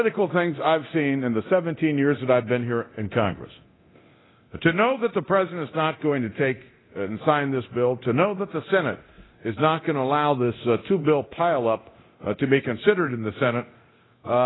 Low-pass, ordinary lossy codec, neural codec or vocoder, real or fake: 7.2 kHz; AAC, 16 kbps; codec, 24 kHz, 0.9 kbps, DualCodec; fake